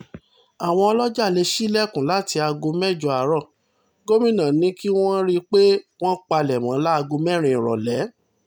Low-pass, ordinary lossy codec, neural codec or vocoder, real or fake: none; none; none; real